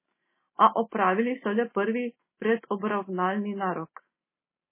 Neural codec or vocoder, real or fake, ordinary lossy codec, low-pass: none; real; MP3, 16 kbps; 3.6 kHz